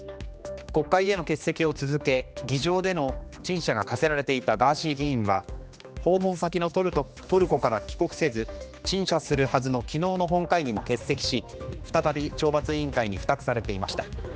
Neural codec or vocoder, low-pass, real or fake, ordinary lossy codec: codec, 16 kHz, 2 kbps, X-Codec, HuBERT features, trained on general audio; none; fake; none